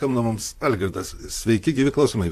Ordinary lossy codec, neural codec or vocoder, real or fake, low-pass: AAC, 64 kbps; vocoder, 44.1 kHz, 128 mel bands, Pupu-Vocoder; fake; 14.4 kHz